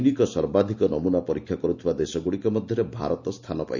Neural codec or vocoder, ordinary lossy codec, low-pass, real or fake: none; none; 7.2 kHz; real